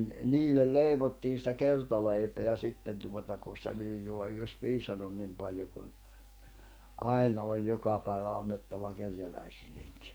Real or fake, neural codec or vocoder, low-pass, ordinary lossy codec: fake; codec, 44.1 kHz, 2.6 kbps, SNAC; none; none